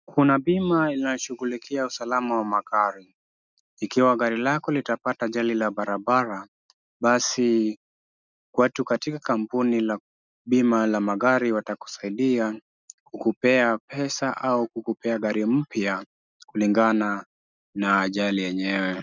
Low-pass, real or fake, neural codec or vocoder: 7.2 kHz; real; none